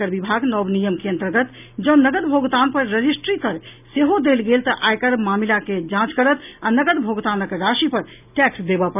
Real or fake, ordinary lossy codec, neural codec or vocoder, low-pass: real; none; none; 3.6 kHz